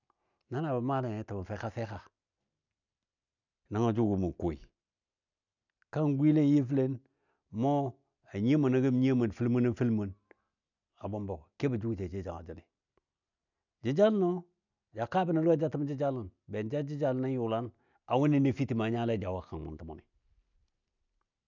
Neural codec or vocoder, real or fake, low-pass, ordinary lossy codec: none; real; 7.2 kHz; none